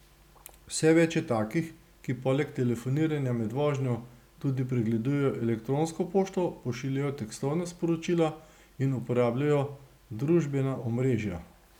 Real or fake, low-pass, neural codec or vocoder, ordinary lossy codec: real; 19.8 kHz; none; none